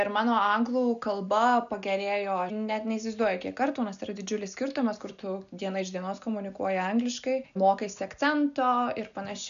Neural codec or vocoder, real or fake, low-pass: none; real; 7.2 kHz